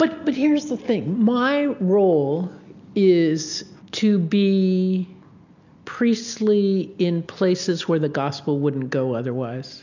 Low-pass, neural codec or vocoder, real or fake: 7.2 kHz; none; real